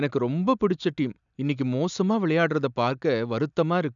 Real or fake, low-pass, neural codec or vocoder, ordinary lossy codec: real; 7.2 kHz; none; none